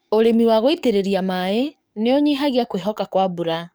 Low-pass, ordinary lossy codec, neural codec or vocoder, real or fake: none; none; codec, 44.1 kHz, 7.8 kbps, DAC; fake